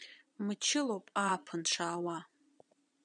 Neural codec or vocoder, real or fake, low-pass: vocoder, 22.05 kHz, 80 mel bands, Vocos; fake; 9.9 kHz